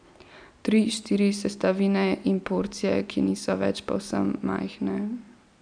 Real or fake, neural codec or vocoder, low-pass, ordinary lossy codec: real; none; 9.9 kHz; none